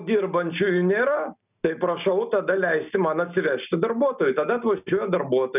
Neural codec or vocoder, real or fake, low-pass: none; real; 3.6 kHz